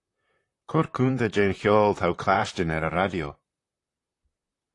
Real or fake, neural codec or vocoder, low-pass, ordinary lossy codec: fake; vocoder, 44.1 kHz, 128 mel bands, Pupu-Vocoder; 10.8 kHz; AAC, 48 kbps